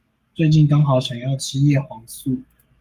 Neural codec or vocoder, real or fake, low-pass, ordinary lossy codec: codec, 44.1 kHz, 7.8 kbps, Pupu-Codec; fake; 14.4 kHz; Opus, 32 kbps